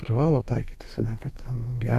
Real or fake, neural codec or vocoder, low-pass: fake; codec, 32 kHz, 1.9 kbps, SNAC; 14.4 kHz